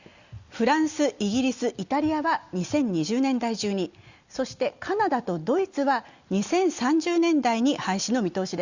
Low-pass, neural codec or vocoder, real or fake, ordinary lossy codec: 7.2 kHz; none; real; Opus, 64 kbps